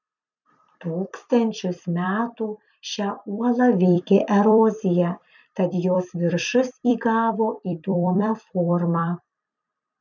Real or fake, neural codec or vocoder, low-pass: fake; vocoder, 44.1 kHz, 128 mel bands every 256 samples, BigVGAN v2; 7.2 kHz